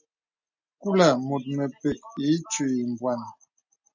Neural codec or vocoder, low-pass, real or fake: none; 7.2 kHz; real